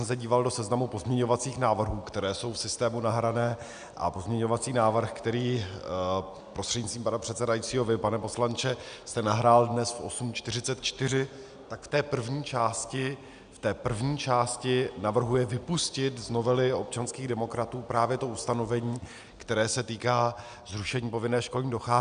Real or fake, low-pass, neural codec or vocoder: real; 9.9 kHz; none